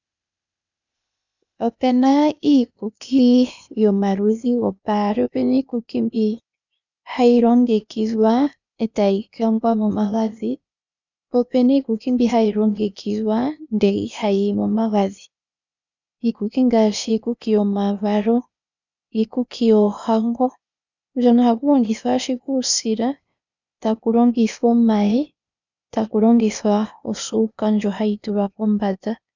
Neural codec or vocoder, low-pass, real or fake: codec, 16 kHz, 0.8 kbps, ZipCodec; 7.2 kHz; fake